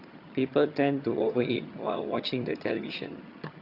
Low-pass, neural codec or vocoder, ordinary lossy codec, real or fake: 5.4 kHz; vocoder, 22.05 kHz, 80 mel bands, HiFi-GAN; Opus, 64 kbps; fake